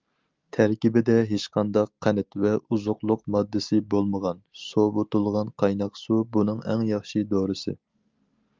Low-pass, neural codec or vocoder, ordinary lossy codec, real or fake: 7.2 kHz; none; Opus, 24 kbps; real